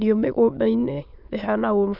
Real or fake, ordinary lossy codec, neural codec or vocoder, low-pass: fake; none; autoencoder, 22.05 kHz, a latent of 192 numbers a frame, VITS, trained on many speakers; 5.4 kHz